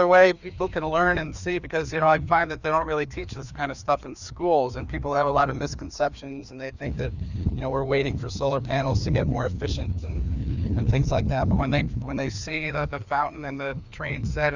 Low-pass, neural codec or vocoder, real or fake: 7.2 kHz; codec, 16 kHz, 2 kbps, FreqCodec, larger model; fake